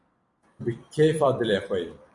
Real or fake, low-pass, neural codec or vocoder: fake; 10.8 kHz; vocoder, 44.1 kHz, 128 mel bands every 256 samples, BigVGAN v2